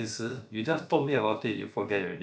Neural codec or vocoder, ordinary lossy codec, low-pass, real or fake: codec, 16 kHz, about 1 kbps, DyCAST, with the encoder's durations; none; none; fake